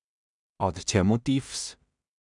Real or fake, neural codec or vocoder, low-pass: fake; codec, 16 kHz in and 24 kHz out, 0.4 kbps, LongCat-Audio-Codec, two codebook decoder; 10.8 kHz